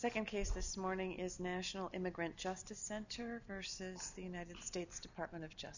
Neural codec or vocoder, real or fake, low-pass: none; real; 7.2 kHz